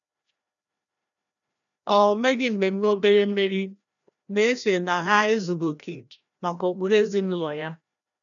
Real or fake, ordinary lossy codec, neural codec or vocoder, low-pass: fake; none; codec, 16 kHz, 1 kbps, FreqCodec, larger model; 7.2 kHz